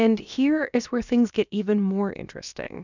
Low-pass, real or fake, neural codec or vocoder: 7.2 kHz; fake; codec, 16 kHz, about 1 kbps, DyCAST, with the encoder's durations